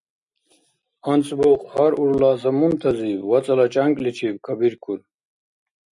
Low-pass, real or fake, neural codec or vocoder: 10.8 kHz; real; none